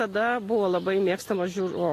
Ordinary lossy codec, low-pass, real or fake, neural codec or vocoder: AAC, 48 kbps; 14.4 kHz; real; none